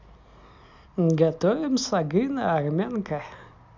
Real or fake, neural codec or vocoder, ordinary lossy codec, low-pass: real; none; MP3, 64 kbps; 7.2 kHz